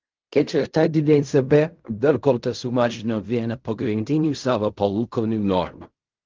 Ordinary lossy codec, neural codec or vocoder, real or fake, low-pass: Opus, 24 kbps; codec, 16 kHz in and 24 kHz out, 0.4 kbps, LongCat-Audio-Codec, fine tuned four codebook decoder; fake; 7.2 kHz